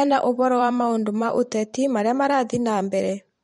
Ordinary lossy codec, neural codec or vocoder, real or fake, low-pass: MP3, 48 kbps; vocoder, 44.1 kHz, 128 mel bands every 512 samples, BigVGAN v2; fake; 19.8 kHz